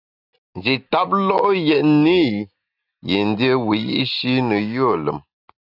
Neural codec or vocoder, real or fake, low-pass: none; real; 5.4 kHz